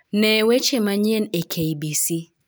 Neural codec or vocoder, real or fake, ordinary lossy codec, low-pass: none; real; none; none